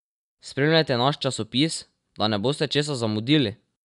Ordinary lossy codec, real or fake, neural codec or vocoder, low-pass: none; real; none; 10.8 kHz